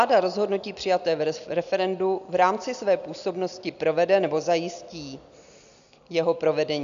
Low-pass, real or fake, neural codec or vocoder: 7.2 kHz; real; none